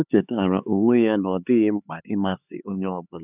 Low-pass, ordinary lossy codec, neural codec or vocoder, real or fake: 3.6 kHz; none; codec, 16 kHz, 4 kbps, X-Codec, HuBERT features, trained on LibriSpeech; fake